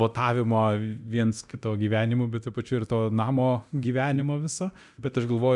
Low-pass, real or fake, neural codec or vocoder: 10.8 kHz; fake; codec, 24 kHz, 0.9 kbps, DualCodec